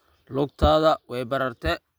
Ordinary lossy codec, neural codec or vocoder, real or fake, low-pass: none; none; real; none